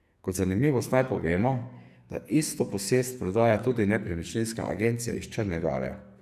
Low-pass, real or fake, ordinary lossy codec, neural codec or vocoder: 14.4 kHz; fake; none; codec, 44.1 kHz, 2.6 kbps, SNAC